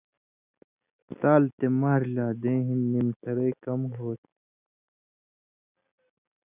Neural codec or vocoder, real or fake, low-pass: none; real; 3.6 kHz